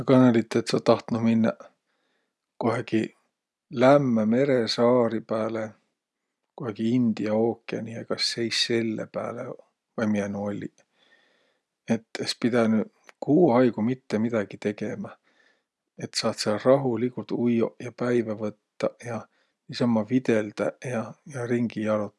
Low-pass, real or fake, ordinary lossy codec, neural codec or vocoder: none; real; none; none